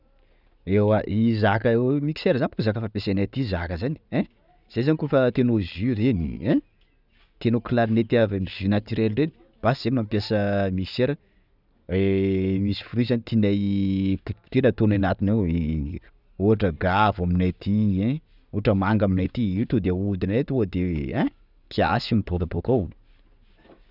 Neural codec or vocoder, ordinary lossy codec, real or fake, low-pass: none; none; real; 5.4 kHz